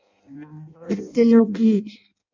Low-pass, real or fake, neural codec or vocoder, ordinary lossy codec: 7.2 kHz; fake; codec, 16 kHz in and 24 kHz out, 0.6 kbps, FireRedTTS-2 codec; MP3, 48 kbps